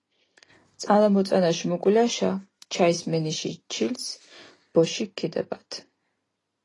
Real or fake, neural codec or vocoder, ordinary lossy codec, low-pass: real; none; AAC, 32 kbps; 10.8 kHz